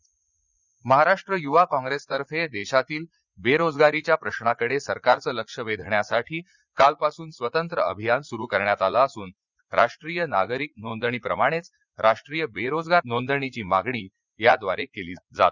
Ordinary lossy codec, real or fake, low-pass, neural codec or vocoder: Opus, 64 kbps; fake; 7.2 kHz; vocoder, 44.1 kHz, 80 mel bands, Vocos